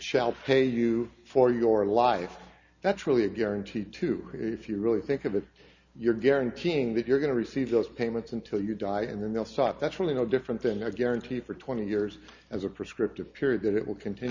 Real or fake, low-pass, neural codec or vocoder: real; 7.2 kHz; none